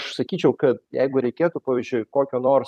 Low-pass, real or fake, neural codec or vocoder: 14.4 kHz; fake; vocoder, 44.1 kHz, 128 mel bands every 256 samples, BigVGAN v2